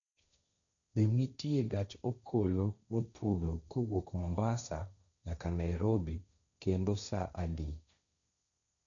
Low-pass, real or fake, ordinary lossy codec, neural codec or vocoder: 7.2 kHz; fake; MP3, 96 kbps; codec, 16 kHz, 1.1 kbps, Voila-Tokenizer